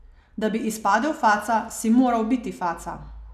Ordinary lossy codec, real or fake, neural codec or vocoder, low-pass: none; real; none; 14.4 kHz